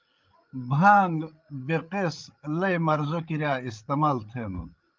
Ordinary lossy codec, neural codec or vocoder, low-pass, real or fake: Opus, 32 kbps; codec, 16 kHz, 8 kbps, FreqCodec, larger model; 7.2 kHz; fake